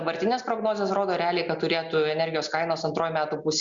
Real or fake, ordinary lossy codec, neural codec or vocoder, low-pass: real; Opus, 64 kbps; none; 7.2 kHz